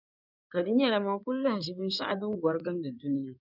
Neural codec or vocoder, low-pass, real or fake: codec, 16 kHz in and 24 kHz out, 2.2 kbps, FireRedTTS-2 codec; 5.4 kHz; fake